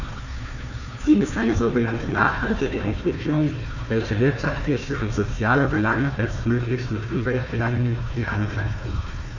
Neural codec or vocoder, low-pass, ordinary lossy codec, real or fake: codec, 16 kHz, 1 kbps, FunCodec, trained on Chinese and English, 50 frames a second; 7.2 kHz; none; fake